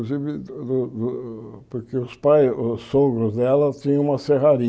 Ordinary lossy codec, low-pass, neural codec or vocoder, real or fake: none; none; none; real